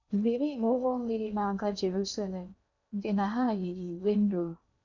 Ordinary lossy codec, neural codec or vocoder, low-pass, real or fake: none; codec, 16 kHz in and 24 kHz out, 0.8 kbps, FocalCodec, streaming, 65536 codes; 7.2 kHz; fake